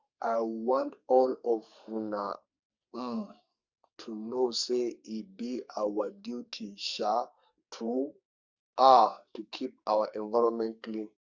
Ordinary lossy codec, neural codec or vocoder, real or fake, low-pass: Opus, 64 kbps; codec, 44.1 kHz, 2.6 kbps, SNAC; fake; 7.2 kHz